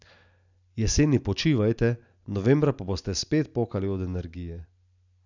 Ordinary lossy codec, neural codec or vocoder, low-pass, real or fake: none; none; 7.2 kHz; real